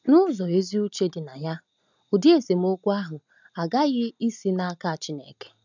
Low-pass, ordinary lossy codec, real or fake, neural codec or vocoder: 7.2 kHz; none; real; none